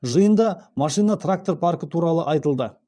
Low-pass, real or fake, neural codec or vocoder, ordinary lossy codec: 9.9 kHz; fake; vocoder, 22.05 kHz, 80 mel bands, Vocos; none